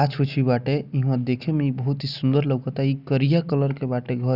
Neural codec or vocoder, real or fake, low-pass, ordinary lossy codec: none; real; 5.4 kHz; none